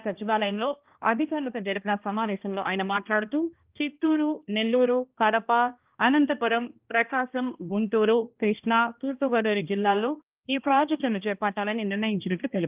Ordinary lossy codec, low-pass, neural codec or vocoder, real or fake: Opus, 32 kbps; 3.6 kHz; codec, 16 kHz, 1 kbps, X-Codec, HuBERT features, trained on balanced general audio; fake